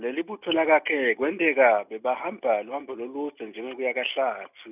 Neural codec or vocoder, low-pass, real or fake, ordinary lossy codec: none; 3.6 kHz; real; none